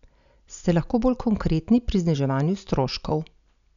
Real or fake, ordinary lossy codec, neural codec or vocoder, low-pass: real; none; none; 7.2 kHz